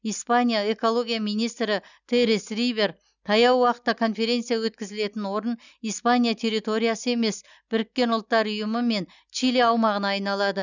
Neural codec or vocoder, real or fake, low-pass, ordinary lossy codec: none; real; 7.2 kHz; none